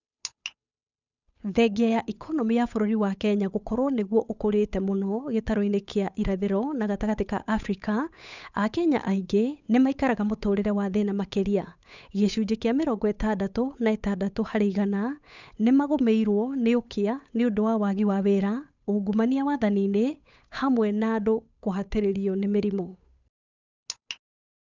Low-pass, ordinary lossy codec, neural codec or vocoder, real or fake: 7.2 kHz; none; codec, 16 kHz, 8 kbps, FunCodec, trained on Chinese and English, 25 frames a second; fake